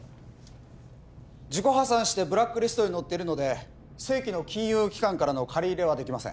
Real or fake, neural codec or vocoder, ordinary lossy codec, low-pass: real; none; none; none